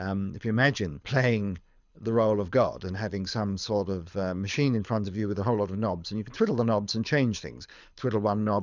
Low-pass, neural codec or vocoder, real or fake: 7.2 kHz; codec, 24 kHz, 6 kbps, HILCodec; fake